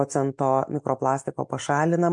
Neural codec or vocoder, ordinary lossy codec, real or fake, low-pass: none; MP3, 64 kbps; real; 10.8 kHz